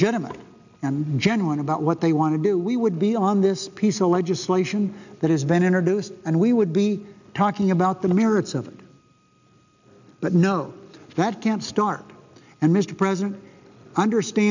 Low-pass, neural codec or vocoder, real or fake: 7.2 kHz; none; real